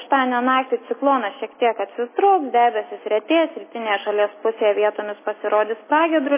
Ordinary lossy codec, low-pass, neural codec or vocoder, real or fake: MP3, 16 kbps; 3.6 kHz; none; real